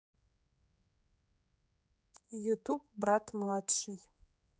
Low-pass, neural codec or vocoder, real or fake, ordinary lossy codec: none; codec, 16 kHz, 4 kbps, X-Codec, HuBERT features, trained on general audio; fake; none